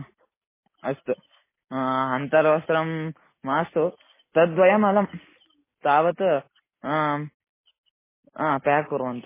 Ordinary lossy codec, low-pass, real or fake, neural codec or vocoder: MP3, 16 kbps; 3.6 kHz; real; none